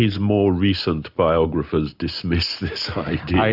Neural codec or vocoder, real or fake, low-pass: none; real; 5.4 kHz